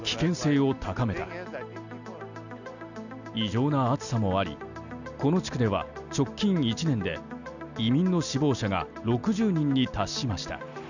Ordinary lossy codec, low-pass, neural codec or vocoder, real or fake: none; 7.2 kHz; none; real